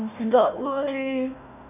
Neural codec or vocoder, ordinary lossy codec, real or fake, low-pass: codec, 16 kHz, 1 kbps, FunCodec, trained on Chinese and English, 50 frames a second; none; fake; 3.6 kHz